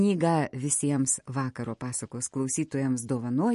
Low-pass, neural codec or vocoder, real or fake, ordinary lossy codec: 14.4 kHz; none; real; MP3, 48 kbps